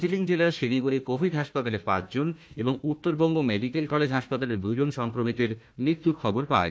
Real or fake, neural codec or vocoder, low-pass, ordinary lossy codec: fake; codec, 16 kHz, 1 kbps, FunCodec, trained on Chinese and English, 50 frames a second; none; none